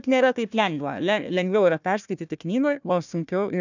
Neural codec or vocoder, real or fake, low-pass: codec, 16 kHz, 1 kbps, FunCodec, trained on Chinese and English, 50 frames a second; fake; 7.2 kHz